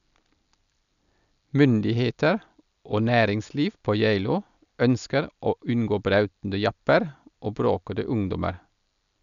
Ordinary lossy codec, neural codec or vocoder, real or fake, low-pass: none; none; real; 7.2 kHz